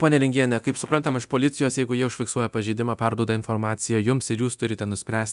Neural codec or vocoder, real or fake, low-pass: codec, 24 kHz, 0.9 kbps, DualCodec; fake; 10.8 kHz